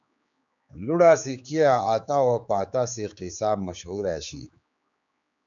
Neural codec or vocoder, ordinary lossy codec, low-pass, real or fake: codec, 16 kHz, 4 kbps, X-Codec, HuBERT features, trained on general audio; MP3, 96 kbps; 7.2 kHz; fake